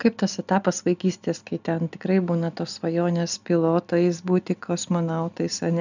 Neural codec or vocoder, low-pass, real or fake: none; 7.2 kHz; real